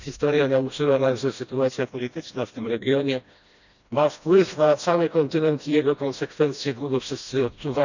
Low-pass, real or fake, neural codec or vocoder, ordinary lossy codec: 7.2 kHz; fake; codec, 16 kHz, 1 kbps, FreqCodec, smaller model; none